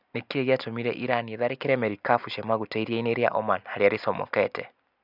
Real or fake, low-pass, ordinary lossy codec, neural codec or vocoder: real; 5.4 kHz; none; none